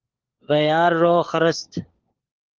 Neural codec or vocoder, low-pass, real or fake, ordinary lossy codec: codec, 16 kHz, 4 kbps, FunCodec, trained on LibriTTS, 50 frames a second; 7.2 kHz; fake; Opus, 16 kbps